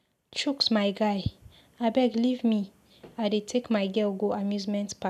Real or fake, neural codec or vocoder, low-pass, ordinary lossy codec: real; none; 14.4 kHz; none